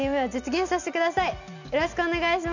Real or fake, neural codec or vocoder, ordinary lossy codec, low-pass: real; none; none; 7.2 kHz